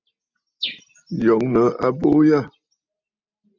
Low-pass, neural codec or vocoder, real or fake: 7.2 kHz; none; real